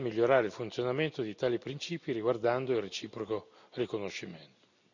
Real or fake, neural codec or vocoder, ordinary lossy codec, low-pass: real; none; none; 7.2 kHz